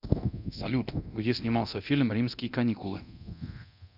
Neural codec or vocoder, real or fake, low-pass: codec, 24 kHz, 0.9 kbps, DualCodec; fake; 5.4 kHz